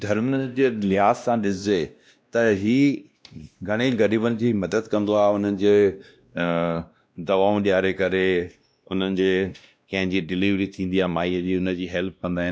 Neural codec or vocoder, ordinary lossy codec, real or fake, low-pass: codec, 16 kHz, 1 kbps, X-Codec, WavLM features, trained on Multilingual LibriSpeech; none; fake; none